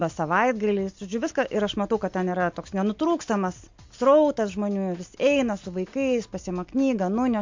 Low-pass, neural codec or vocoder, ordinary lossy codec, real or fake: 7.2 kHz; none; MP3, 48 kbps; real